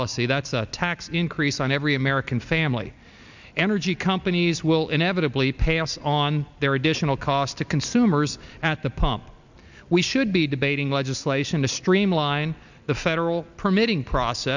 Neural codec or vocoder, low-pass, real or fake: none; 7.2 kHz; real